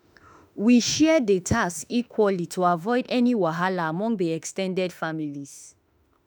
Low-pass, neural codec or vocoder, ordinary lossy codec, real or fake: none; autoencoder, 48 kHz, 32 numbers a frame, DAC-VAE, trained on Japanese speech; none; fake